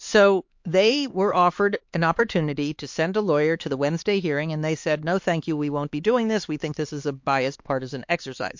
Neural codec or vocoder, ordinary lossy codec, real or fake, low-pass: codec, 16 kHz, 4 kbps, X-Codec, HuBERT features, trained on LibriSpeech; MP3, 48 kbps; fake; 7.2 kHz